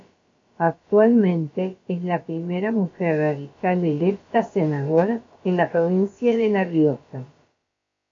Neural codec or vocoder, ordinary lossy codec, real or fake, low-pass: codec, 16 kHz, about 1 kbps, DyCAST, with the encoder's durations; MP3, 48 kbps; fake; 7.2 kHz